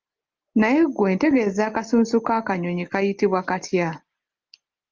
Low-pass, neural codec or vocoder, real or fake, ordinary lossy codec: 7.2 kHz; none; real; Opus, 24 kbps